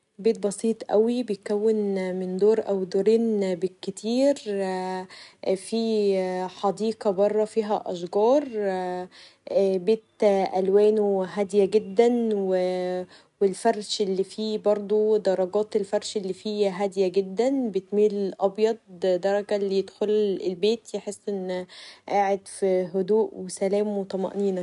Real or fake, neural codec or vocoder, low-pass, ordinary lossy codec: real; none; 10.8 kHz; none